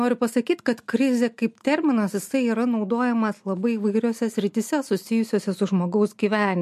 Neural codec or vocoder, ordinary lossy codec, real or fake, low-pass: none; MP3, 64 kbps; real; 14.4 kHz